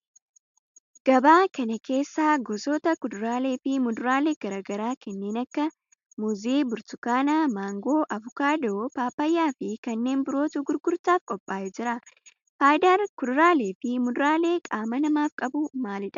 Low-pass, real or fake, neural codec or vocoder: 7.2 kHz; real; none